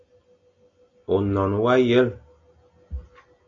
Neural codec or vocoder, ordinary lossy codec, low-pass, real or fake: none; AAC, 64 kbps; 7.2 kHz; real